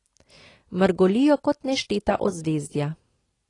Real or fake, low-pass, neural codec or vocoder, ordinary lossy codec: real; 10.8 kHz; none; AAC, 32 kbps